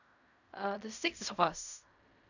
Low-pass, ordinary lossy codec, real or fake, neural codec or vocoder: 7.2 kHz; none; fake; codec, 16 kHz in and 24 kHz out, 0.4 kbps, LongCat-Audio-Codec, fine tuned four codebook decoder